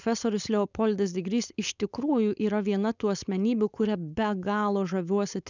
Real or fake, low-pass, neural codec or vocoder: fake; 7.2 kHz; codec, 16 kHz, 4.8 kbps, FACodec